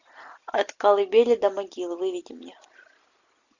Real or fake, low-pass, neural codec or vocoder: real; 7.2 kHz; none